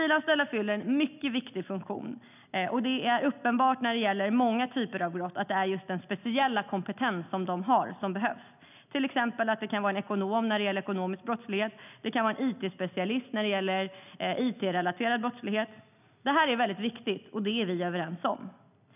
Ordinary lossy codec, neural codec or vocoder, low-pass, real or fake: none; none; 3.6 kHz; real